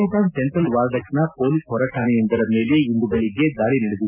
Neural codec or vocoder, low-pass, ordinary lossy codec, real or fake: none; 3.6 kHz; none; real